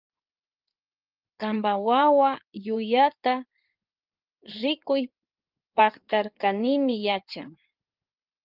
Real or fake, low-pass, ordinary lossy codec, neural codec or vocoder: fake; 5.4 kHz; Opus, 24 kbps; codec, 16 kHz in and 24 kHz out, 2.2 kbps, FireRedTTS-2 codec